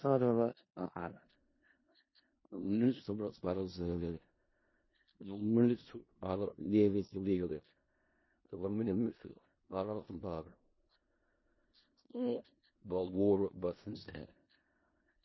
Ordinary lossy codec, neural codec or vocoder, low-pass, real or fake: MP3, 24 kbps; codec, 16 kHz in and 24 kHz out, 0.4 kbps, LongCat-Audio-Codec, four codebook decoder; 7.2 kHz; fake